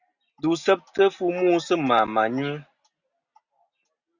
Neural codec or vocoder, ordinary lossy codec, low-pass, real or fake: none; Opus, 64 kbps; 7.2 kHz; real